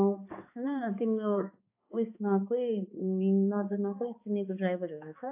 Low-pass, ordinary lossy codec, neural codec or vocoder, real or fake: 3.6 kHz; AAC, 32 kbps; codec, 16 kHz, 2 kbps, X-Codec, HuBERT features, trained on balanced general audio; fake